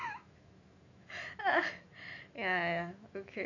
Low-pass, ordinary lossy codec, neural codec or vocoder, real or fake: 7.2 kHz; AAC, 48 kbps; autoencoder, 48 kHz, 128 numbers a frame, DAC-VAE, trained on Japanese speech; fake